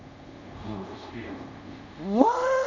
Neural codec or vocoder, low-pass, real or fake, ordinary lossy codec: codec, 24 kHz, 0.5 kbps, DualCodec; 7.2 kHz; fake; MP3, 32 kbps